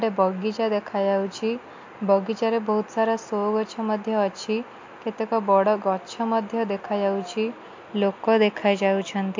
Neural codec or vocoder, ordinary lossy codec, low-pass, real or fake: none; MP3, 48 kbps; 7.2 kHz; real